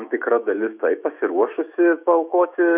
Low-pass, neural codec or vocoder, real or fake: 3.6 kHz; autoencoder, 48 kHz, 128 numbers a frame, DAC-VAE, trained on Japanese speech; fake